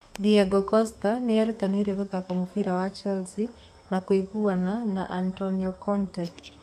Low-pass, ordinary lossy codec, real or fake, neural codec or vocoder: 14.4 kHz; none; fake; codec, 32 kHz, 1.9 kbps, SNAC